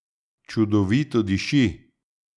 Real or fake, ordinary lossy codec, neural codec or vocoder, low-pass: real; none; none; 10.8 kHz